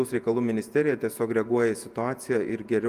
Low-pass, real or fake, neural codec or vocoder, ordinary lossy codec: 14.4 kHz; fake; vocoder, 48 kHz, 128 mel bands, Vocos; Opus, 32 kbps